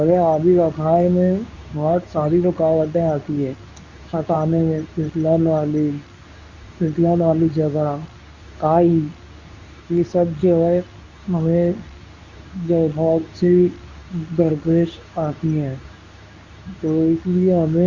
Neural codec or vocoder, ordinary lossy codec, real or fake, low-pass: codec, 24 kHz, 0.9 kbps, WavTokenizer, medium speech release version 2; Opus, 64 kbps; fake; 7.2 kHz